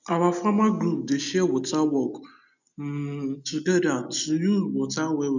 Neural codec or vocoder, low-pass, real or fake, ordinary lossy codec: none; 7.2 kHz; real; none